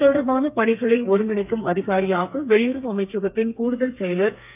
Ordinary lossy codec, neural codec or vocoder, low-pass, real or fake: none; codec, 32 kHz, 1.9 kbps, SNAC; 3.6 kHz; fake